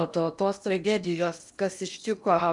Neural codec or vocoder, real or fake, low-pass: codec, 16 kHz in and 24 kHz out, 0.8 kbps, FocalCodec, streaming, 65536 codes; fake; 10.8 kHz